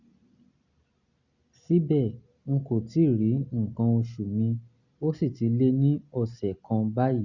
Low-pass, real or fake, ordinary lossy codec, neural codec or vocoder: 7.2 kHz; real; Opus, 64 kbps; none